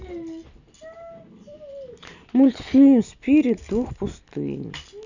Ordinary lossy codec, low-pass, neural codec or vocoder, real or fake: none; 7.2 kHz; none; real